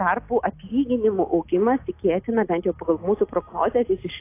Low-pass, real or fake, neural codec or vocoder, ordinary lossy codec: 3.6 kHz; real; none; AAC, 24 kbps